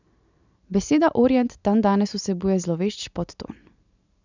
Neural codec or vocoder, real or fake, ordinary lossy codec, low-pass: none; real; none; 7.2 kHz